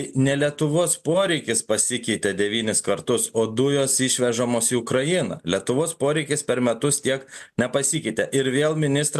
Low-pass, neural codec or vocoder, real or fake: 14.4 kHz; none; real